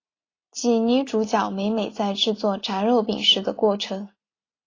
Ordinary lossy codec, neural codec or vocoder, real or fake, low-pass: AAC, 32 kbps; none; real; 7.2 kHz